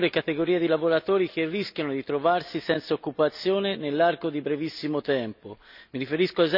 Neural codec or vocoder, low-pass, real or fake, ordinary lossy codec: none; 5.4 kHz; real; none